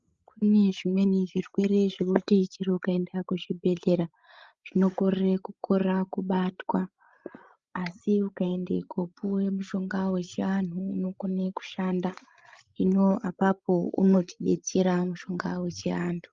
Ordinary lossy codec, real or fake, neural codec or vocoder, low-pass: Opus, 32 kbps; fake; codec, 16 kHz, 16 kbps, FreqCodec, larger model; 7.2 kHz